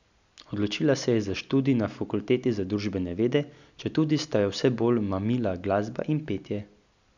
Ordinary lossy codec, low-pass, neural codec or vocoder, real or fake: none; 7.2 kHz; none; real